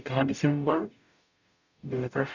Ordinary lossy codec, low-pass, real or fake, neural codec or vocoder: none; 7.2 kHz; fake; codec, 44.1 kHz, 0.9 kbps, DAC